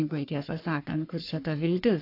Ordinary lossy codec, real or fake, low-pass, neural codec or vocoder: AAC, 32 kbps; fake; 5.4 kHz; codec, 44.1 kHz, 3.4 kbps, Pupu-Codec